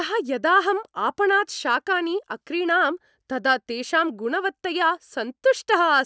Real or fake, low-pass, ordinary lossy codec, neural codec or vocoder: real; none; none; none